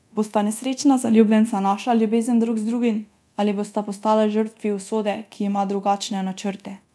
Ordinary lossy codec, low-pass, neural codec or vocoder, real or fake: none; none; codec, 24 kHz, 0.9 kbps, DualCodec; fake